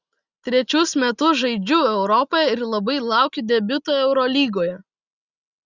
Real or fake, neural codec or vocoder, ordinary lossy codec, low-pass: real; none; Opus, 64 kbps; 7.2 kHz